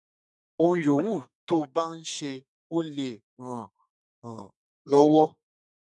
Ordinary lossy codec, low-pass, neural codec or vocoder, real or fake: none; 10.8 kHz; codec, 32 kHz, 1.9 kbps, SNAC; fake